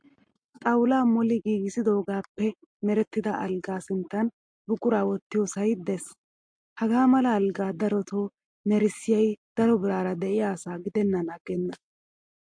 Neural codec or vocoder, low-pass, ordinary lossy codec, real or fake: none; 9.9 kHz; MP3, 48 kbps; real